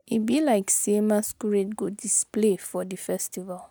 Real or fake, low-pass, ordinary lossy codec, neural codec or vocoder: real; none; none; none